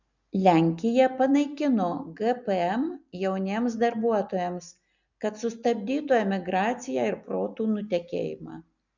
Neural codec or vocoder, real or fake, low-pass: none; real; 7.2 kHz